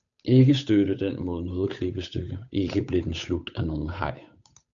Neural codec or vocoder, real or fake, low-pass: codec, 16 kHz, 8 kbps, FunCodec, trained on Chinese and English, 25 frames a second; fake; 7.2 kHz